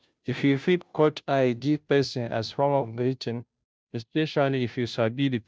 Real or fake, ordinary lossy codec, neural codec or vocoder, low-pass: fake; none; codec, 16 kHz, 0.5 kbps, FunCodec, trained on Chinese and English, 25 frames a second; none